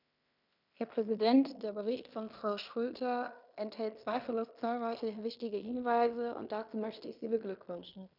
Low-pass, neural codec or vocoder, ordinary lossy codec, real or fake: 5.4 kHz; codec, 16 kHz in and 24 kHz out, 0.9 kbps, LongCat-Audio-Codec, fine tuned four codebook decoder; none; fake